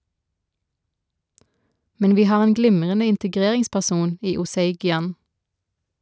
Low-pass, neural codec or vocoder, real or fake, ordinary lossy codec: none; none; real; none